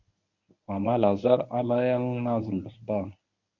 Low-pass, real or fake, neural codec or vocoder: 7.2 kHz; fake; codec, 24 kHz, 0.9 kbps, WavTokenizer, medium speech release version 1